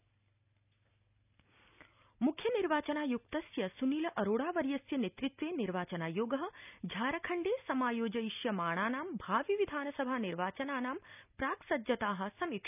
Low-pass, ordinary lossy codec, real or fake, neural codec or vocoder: 3.6 kHz; none; real; none